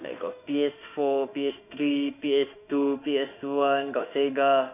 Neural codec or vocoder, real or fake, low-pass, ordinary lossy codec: autoencoder, 48 kHz, 32 numbers a frame, DAC-VAE, trained on Japanese speech; fake; 3.6 kHz; none